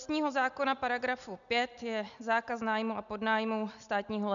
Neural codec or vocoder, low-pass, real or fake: none; 7.2 kHz; real